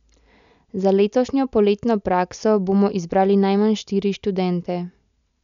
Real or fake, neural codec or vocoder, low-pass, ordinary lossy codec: real; none; 7.2 kHz; none